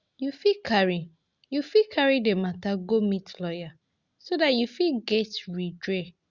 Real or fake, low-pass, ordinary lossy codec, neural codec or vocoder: real; 7.2 kHz; Opus, 64 kbps; none